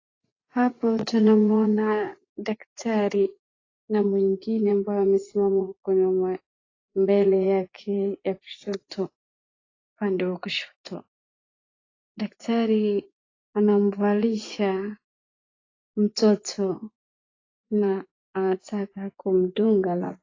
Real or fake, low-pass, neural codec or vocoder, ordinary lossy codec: fake; 7.2 kHz; vocoder, 24 kHz, 100 mel bands, Vocos; AAC, 32 kbps